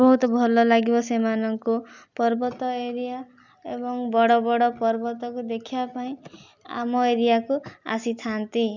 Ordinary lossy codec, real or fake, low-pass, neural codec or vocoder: none; real; 7.2 kHz; none